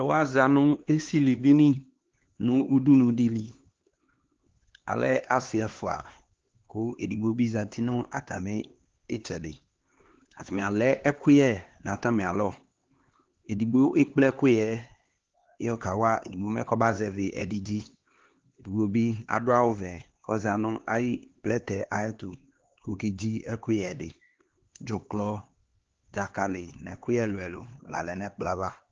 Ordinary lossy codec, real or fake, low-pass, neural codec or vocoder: Opus, 16 kbps; fake; 7.2 kHz; codec, 16 kHz, 2 kbps, X-Codec, HuBERT features, trained on LibriSpeech